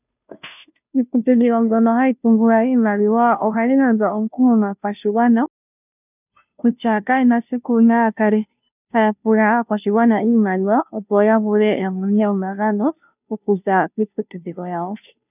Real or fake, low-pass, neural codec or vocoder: fake; 3.6 kHz; codec, 16 kHz, 0.5 kbps, FunCodec, trained on Chinese and English, 25 frames a second